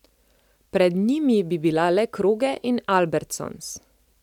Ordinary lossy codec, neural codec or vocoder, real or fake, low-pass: none; none; real; 19.8 kHz